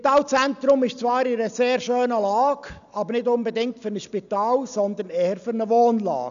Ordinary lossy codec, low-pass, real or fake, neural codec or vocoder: none; 7.2 kHz; real; none